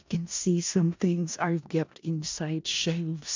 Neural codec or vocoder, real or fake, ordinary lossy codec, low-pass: codec, 16 kHz in and 24 kHz out, 0.4 kbps, LongCat-Audio-Codec, four codebook decoder; fake; MP3, 64 kbps; 7.2 kHz